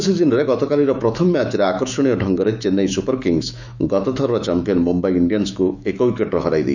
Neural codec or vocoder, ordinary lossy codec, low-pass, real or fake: autoencoder, 48 kHz, 128 numbers a frame, DAC-VAE, trained on Japanese speech; none; 7.2 kHz; fake